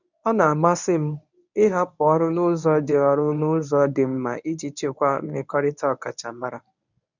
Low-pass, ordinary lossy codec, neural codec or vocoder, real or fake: 7.2 kHz; none; codec, 24 kHz, 0.9 kbps, WavTokenizer, medium speech release version 1; fake